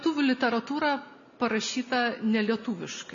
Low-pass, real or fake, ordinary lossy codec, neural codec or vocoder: 7.2 kHz; real; MP3, 64 kbps; none